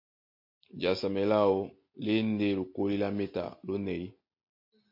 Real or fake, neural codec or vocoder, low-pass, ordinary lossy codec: real; none; 5.4 kHz; MP3, 32 kbps